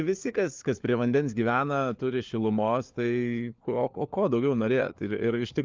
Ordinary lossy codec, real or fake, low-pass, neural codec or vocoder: Opus, 24 kbps; fake; 7.2 kHz; codec, 16 kHz, 4 kbps, FunCodec, trained on LibriTTS, 50 frames a second